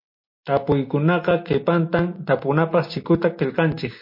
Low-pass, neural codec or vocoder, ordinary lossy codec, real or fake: 5.4 kHz; none; MP3, 32 kbps; real